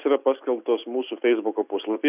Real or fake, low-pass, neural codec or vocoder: real; 3.6 kHz; none